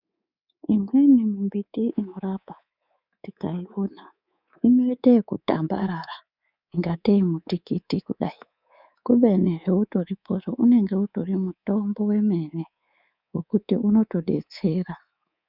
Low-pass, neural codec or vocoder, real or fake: 5.4 kHz; codec, 24 kHz, 3.1 kbps, DualCodec; fake